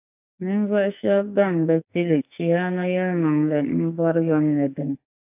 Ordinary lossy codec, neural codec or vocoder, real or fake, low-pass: AAC, 32 kbps; codec, 44.1 kHz, 2.6 kbps, SNAC; fake; 3.6 kHz